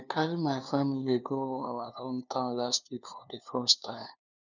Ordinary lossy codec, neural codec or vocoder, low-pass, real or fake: none; codec, 16 kHz, 2 kbps, FunCodec, trained on LibriTTS, 25 frames a second; 7.2 kHz; fake